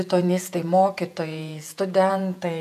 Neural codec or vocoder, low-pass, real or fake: vocoder, 48 kHz, 128 mel bands, Vocos; 14.4 kHz; fake